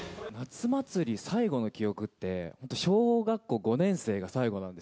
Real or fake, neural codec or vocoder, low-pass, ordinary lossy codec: real; none; none; none